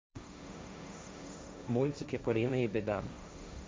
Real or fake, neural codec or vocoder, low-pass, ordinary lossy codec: fake; codec, 16 kHz, 1.1 kbps, Voila-Tokenizer; none; none